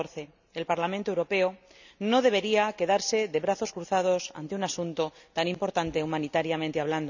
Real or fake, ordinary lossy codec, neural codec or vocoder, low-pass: real; none; none; 7.2 kHz